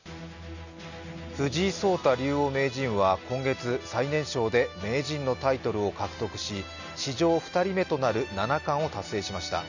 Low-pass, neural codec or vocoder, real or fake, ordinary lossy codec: 7.2 kHz; none; real; none